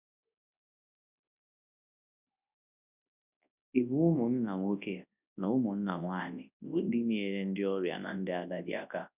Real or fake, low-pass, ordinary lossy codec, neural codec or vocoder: fake; 3.6 kHz; none; codec, 24 kHz, 0.9 kbps, WavTokenizer, large speech release